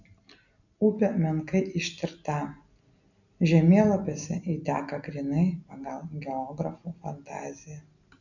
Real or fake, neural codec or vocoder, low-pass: real; none; 7.2 kHz